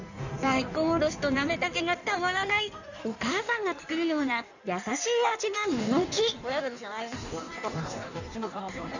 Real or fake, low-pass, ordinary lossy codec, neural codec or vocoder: fake; 7.2 kHz; none; codec, 16 kHz in and 24 kHz out, 1.1 kbps, FireRedTTS-2 codec